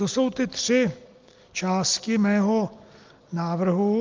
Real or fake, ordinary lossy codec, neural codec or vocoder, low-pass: real; Opus, 32 kbps; none; 7.2 kHz